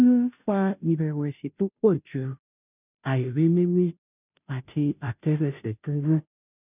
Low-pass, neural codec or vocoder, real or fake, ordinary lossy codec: 3.6 kHz; codec, 16 kHz, 0.5 kbps, FunCodec, trained on Chinese and English, 25 frames a second; fake; none